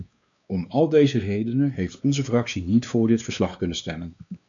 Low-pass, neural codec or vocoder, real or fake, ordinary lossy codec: 7.2 kHz; codec, 16 kHz, 2 kbps, X-Codec, WavLM features, trained on Multilingual LibriSpeech; fake; MP3, 96 kbps